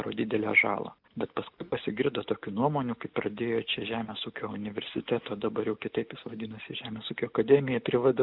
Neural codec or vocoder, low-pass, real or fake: none; 5.4 kHz; real